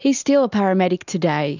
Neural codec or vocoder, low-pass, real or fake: none; 7.2 kHz; real